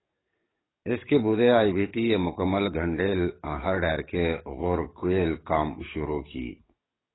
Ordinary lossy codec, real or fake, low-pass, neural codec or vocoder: AAC, 16 kbps; fake; 7.2 kHz; codec, 44.1 kHz, 7.8 kbps, DAC